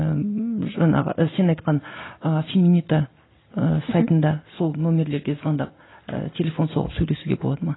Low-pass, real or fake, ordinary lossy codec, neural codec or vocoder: 7.2 kHz; real; AAC, 16 kbps; none